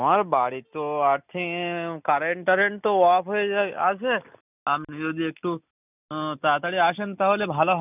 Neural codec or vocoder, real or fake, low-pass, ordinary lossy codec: none; real; 3.6 kHz; none